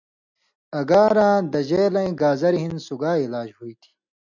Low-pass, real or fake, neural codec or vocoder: 7.2 kHz; real; none